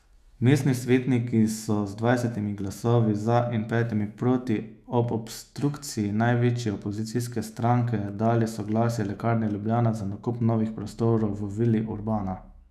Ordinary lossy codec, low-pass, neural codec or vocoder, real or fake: none; 14.4 kHz; autoencoder, 48 kHz, 128 numbers a frame, DAC-VAE, trained on Japanese speech; fake